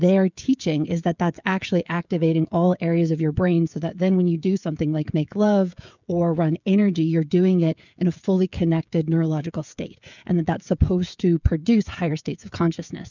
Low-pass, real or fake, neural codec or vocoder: 7.2 kHz; fake; codec, 16 kHz, 16 kbps, FreqCodec, smaller model